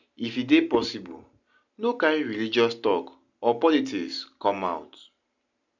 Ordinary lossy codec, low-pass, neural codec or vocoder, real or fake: none; 7.2 kHz; none; real